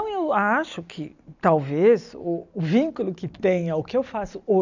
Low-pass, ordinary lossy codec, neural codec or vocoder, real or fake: 7.2 kHz; none; none; real